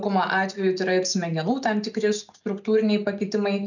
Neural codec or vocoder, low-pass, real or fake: none; 7.2 kHz; real